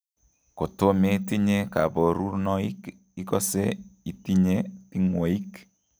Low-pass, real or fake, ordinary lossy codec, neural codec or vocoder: none; real; none; none